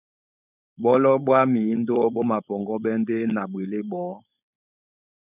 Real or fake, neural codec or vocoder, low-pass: fake; codec, 16 kHz, 4.8 kbps, FACodec; 3.6 kHz